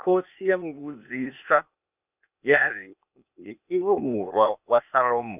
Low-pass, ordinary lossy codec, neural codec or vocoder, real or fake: 3.6 kHz; none; codec, 16 kHz, 0.8 kbps, ZipCodec; fake